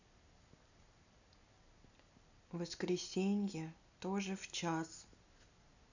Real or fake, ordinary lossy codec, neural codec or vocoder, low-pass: real; none; none; 7.2 kHz